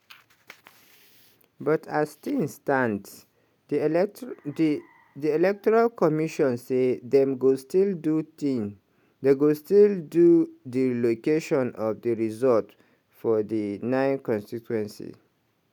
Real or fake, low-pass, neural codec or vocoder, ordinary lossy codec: real; 19.8 kHz; none; none